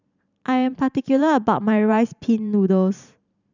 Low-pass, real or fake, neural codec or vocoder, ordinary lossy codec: 7.2 kHz; fake; vocoder, 44.1 kHz, 128 mel bands every 512 samples, BigVGAN v2; none